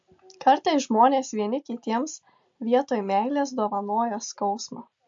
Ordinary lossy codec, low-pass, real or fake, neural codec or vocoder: MP3, 48 kbps; 7.2 kHz; real; none